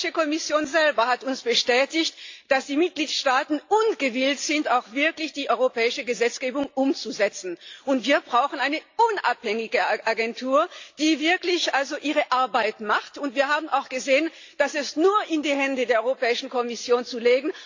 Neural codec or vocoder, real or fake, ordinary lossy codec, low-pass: none; real; AAC, 48 kbps; 7.2 kHz